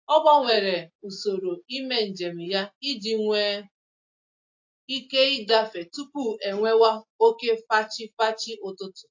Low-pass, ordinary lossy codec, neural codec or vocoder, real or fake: 7.2 kHz; AAC, 48 kbps; none; real